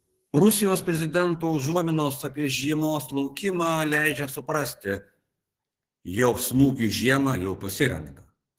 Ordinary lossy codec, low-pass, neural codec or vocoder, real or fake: Opus, 16 kbps; 14.4 kHz; codec, 32 kHz, 1.9 kbps, SNAC; fake